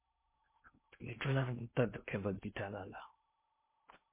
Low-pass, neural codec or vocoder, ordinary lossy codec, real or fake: 3.6 kHz; codec, 16 kHz in and 24 kHz out, 0.6 kbps, FocalCodec, streaming, 2048 codes; MP3, 24 kbps; fake